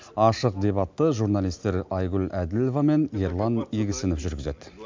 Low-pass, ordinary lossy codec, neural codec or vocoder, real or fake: 7.2 kHz; MP3, 64 kbps; none; real